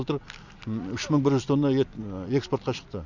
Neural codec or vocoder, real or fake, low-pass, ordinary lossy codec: none; real; 7.2 kHz; none